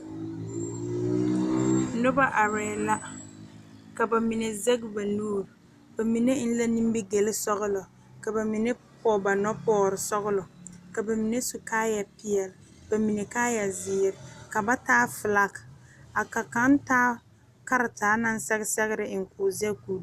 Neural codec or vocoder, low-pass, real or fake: none; 14.4 kHz; real